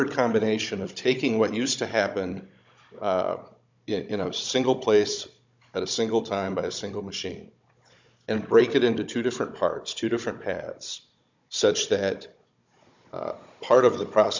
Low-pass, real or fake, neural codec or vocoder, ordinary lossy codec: 7.2 kHz; fake; codec, 16 kHz, 16 kbps, FunCodec, trained on Chinese and English, 50 frames a second; MP3, 64 kbps